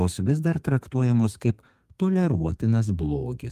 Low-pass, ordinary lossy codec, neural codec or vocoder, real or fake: 14.4 kHz; Opus, 32 kbps; codec, 32 kHz, 1.9 kbps, SNAC; fake